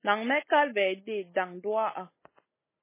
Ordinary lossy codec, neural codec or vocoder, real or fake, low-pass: MP3, 16 kbps; none; real; 3.6 kHz